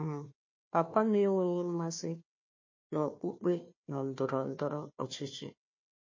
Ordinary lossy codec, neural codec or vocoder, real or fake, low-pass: MP3, 32 kbps; codec, 16 kHz, 1 kbps, FunCodec, trained on Chinese and English, 50 frames a second; fake; 7.2 kHz